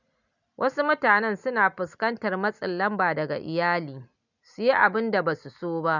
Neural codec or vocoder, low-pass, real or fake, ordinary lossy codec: none; 7.2 kHz; real; none